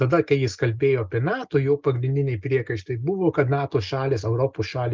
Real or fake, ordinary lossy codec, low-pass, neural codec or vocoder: real; Opus, 24 kbps; 7.2 kHz; none